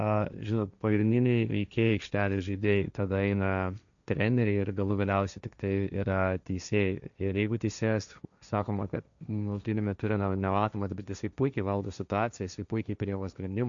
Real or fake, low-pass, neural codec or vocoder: fake; 7.2 kHz; codec, 16 kHz, 1.1 kbps, Voila-Tokenizer